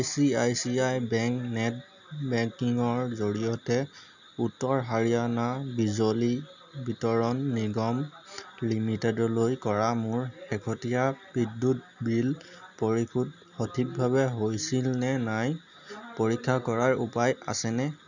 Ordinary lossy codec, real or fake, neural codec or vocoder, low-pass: none; real; none; 7.2 kHz